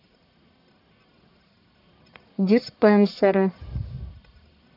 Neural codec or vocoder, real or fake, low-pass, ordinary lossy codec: codec, 44.1 kHz, 1.7 kbps, Pupu-Codec; fake; 5.4 kHz; none